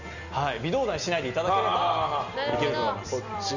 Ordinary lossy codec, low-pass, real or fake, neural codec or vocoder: none; 7.2 kHz; real; none